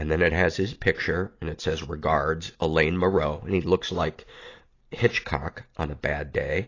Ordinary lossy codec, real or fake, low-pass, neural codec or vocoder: AAC, 32 kbps; real; 7.2 kHz; none